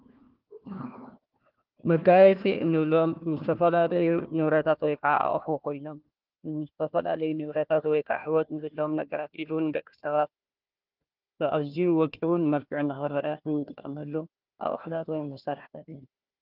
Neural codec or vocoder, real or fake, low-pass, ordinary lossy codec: codec, 16 kHz, 1 kbps, FunCodec, trained on Chinese and English, 50 frames a second; fake; 5.4 kHz; Opus, 32 kbps